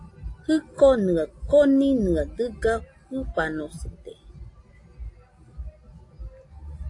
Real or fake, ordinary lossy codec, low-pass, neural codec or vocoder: real; AAC, 48 kbps; 10.8 kHz; none